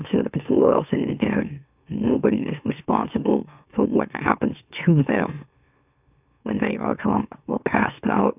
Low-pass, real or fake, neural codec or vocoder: 3.6 kHz; fake; autoencoder, 44.1 kHz, a latent of 192 numbers a frame, MeloTTS